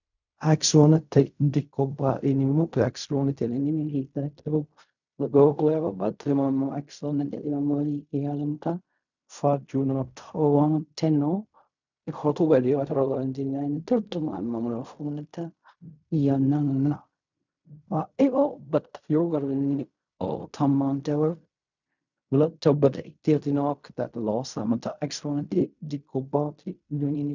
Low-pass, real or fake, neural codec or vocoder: 7.2 kHz; fake; codec, 16 kHz in and 24 kHz out, 0.4 kbps, LongCat-Audio-Codec, fine tuned four codebook decoder